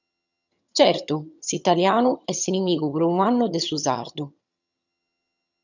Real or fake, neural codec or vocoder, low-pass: fake; vocoder, 22.05 kHz, 80 mel bands, HiFi-GAN; 7.2 kHz